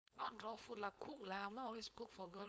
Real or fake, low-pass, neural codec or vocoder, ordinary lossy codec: fake; none; codec, 16 kHz, 4.8 kbps, FACodec; none